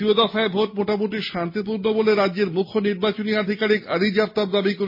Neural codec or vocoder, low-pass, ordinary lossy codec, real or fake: none; 5.4 kHz; MP3, 24 kbps; real